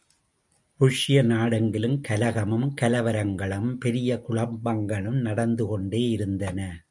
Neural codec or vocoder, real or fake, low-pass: none; real; 10.8 kHz